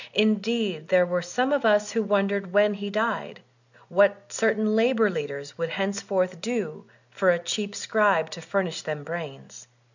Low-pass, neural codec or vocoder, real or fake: 7.2 kHz; none; real